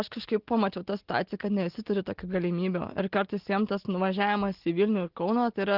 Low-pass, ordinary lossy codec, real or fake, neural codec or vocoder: 5.4 kHz; Opus, 16 kbps; real; none